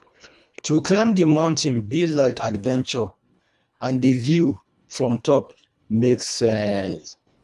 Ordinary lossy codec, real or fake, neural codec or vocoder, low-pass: none; fake; codec, 24 kHz, 1.5 kbps, HILCodec; none